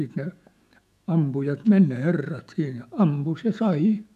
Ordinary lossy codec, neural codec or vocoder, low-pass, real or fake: none; autoencoder, 48 kHz, 128 numbers a frame, DAC-VAE, trained on Japanese speech; 14.4 kHz; fake